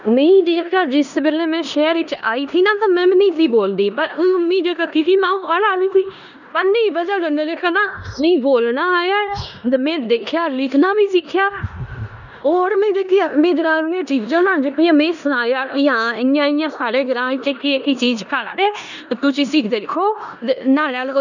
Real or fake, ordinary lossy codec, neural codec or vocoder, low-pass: fake; none; codec, 16 kHz in and 24 kHz out, 0.9 kbps, LongCat-Audio-Codec, four codebook decoder; 7.2 kHz